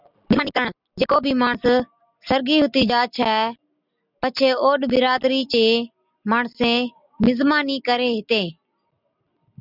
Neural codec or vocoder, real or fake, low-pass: none; real; 5.4 kHz